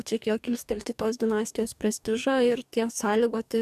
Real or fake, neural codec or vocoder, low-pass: fake; codec, 44.1 kHz, 2.6 kbps, DAC; 14.4 kHz